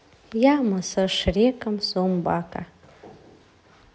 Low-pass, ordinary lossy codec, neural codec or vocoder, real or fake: none; none; none; real